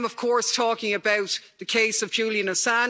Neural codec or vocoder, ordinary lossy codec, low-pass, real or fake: none; none; none; real